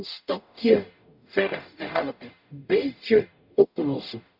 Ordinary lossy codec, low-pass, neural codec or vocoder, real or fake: none; 5.4 kHz; codec, 44.1 kHz, 0.9 kbps, DAC; fake